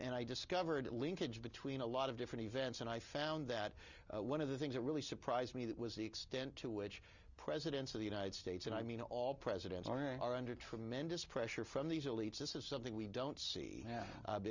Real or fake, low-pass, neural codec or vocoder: real; 7.2 kHz; none